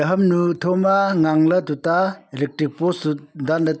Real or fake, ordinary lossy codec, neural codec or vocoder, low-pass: real; none; none; none